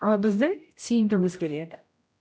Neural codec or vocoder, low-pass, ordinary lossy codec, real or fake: codec, 16 kHz, 0.5 kbps, X-Codec, HuBERT features, trained on general audio; none; none; fake